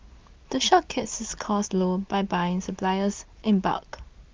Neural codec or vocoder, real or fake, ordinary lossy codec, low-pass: none; real; Opus, 24 kbps; 7.2 kHz